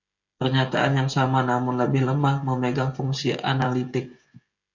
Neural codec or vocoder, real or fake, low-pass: codec, 16 kHz, 16 kbps, FreqCodec, smaller model; fake; 7.2 kHz